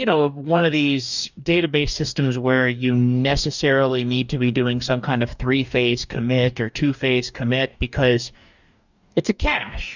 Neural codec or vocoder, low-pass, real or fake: codec, 44.1 kHz, 2.6 kbps, DAC; 7.2 kHz; fake